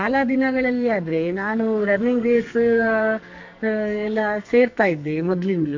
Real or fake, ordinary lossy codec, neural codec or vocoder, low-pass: fake; MP3, 48 kbps; codec, 44.1 kHz, 2.6 kbps, SNAC; 7.2 kHz